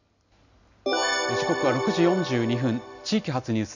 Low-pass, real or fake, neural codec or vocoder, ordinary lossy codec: 7.2 kHz; real; none; none